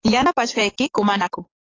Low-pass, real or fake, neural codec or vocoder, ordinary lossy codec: 7.2 kHz; fake; codec, 44.1 kHz, 7.8 kbps, DAC; AAC, 32 kbps